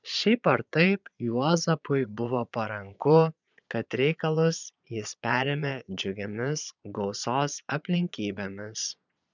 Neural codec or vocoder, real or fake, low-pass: vocoder, 22.05 kHz, 80 mel bands, Vocos; fake; 7.2 kHz